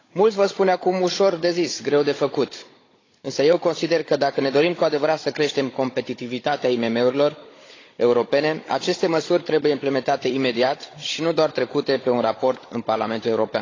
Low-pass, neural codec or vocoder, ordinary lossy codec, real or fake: 7.2 kHz; codec, 16 kHz, 16 kbps, FunCodec, trained on Chinese and English, 50 frames a second; AAC, 32 kbps; fake